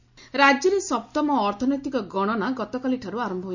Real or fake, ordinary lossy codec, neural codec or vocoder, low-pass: real; none; none; 7.2 kHz